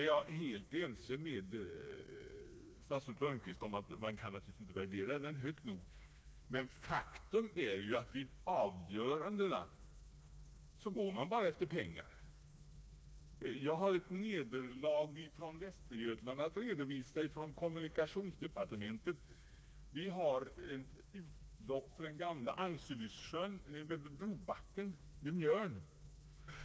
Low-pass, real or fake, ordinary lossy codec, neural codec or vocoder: none; fake; none; codec, 16 kHz, 2 kbps, FreqCodec, smaller model